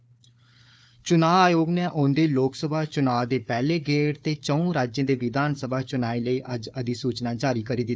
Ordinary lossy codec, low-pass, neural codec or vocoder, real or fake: none; none; codec, 16 kHz, 4 kbps, FunCodec, trained on Chinese and English, 50 frames a second; fake